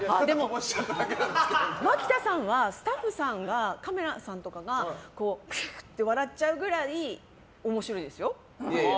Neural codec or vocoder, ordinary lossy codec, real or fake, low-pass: none; none; real; none